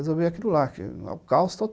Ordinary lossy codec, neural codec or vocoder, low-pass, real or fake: none; none; none; real